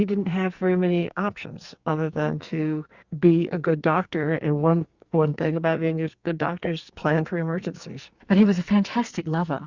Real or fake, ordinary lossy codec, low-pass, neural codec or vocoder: fake; Opus, 64 kbps; 7.2 kHz; codec, 44.1 kHz, 2.6 kbps, SNAC